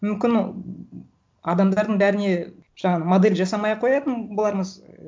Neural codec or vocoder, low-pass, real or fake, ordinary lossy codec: none; none; real; none